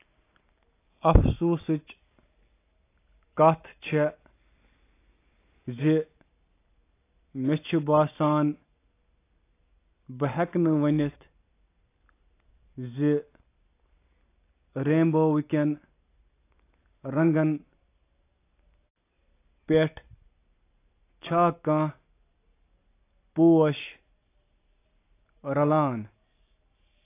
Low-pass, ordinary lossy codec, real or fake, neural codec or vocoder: 3.6 kHz; AAC, 24 kbps; real; none